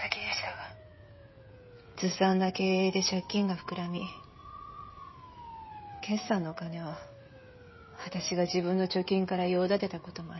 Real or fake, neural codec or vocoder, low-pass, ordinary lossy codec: fake; codec, 16 kHz, 16 kbps, FreqCodec, smaller model; 7.2 kHz; MP3, 24 kbps